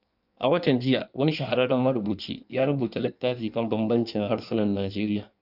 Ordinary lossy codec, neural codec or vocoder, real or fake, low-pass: none; codec, 16 kHz in and 24 kHz out, 1.1 kbps, FireRedTTS-2 codec; fake; 5.4 kHz